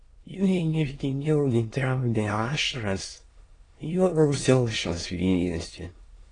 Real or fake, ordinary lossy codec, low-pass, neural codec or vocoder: fake; AAC, 32 kbps; 9.9 kHz; autoencoder, 22.05 kHz, a latent of 192 numbers a frame, VITS, trained on many speakers